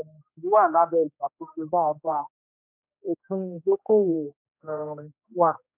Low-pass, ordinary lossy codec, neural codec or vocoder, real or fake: 3.6 kHz; none; codec, 16 kHz, 1 kbps, X-Codec, HuBERT features, trained on general audio; fake